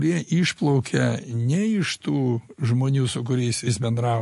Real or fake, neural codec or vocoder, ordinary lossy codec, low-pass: real; none; MP3, 48 kbps; 14.4 kHz